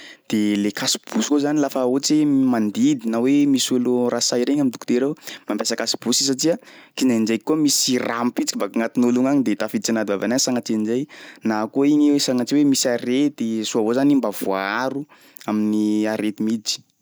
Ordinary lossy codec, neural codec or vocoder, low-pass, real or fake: none; none; none; real